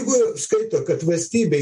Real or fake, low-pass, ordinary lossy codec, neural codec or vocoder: real; 10.8 kHz; MP3, 48 kbps; none